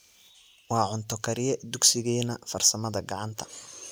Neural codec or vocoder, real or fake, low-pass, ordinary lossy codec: none; real; none; none